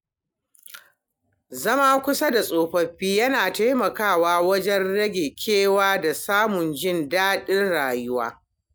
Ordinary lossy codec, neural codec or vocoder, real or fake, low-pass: none; none; real; none